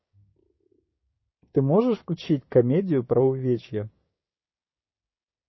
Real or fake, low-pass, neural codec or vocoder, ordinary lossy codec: fake; 7.2 kHz; codec, 16 kHz, 6 kbps, DAC; MP3, 24 kbps